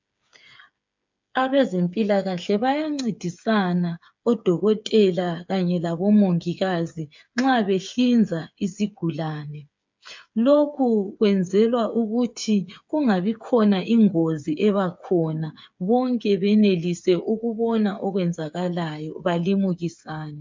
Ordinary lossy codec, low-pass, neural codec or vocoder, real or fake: MP3, 64 kbps; 7.2 kHz; codec, 16 kHz, 8 kbps, FreqCodec, smaller model; fake